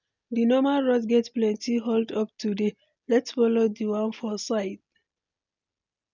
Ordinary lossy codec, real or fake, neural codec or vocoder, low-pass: none; real; none; 7.2 kHz